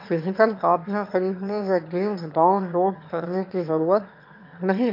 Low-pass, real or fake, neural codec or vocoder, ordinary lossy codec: 5.4 kHz; fake; autoencoder, 22.05 kHz, a latent of 192 numbers a frame, VITS, trained on one speaker; MP3, 48 kbps